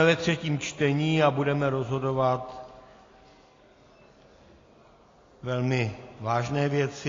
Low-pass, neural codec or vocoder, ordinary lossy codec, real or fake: 7.2 kHz; none; AAC, 32 kbps; real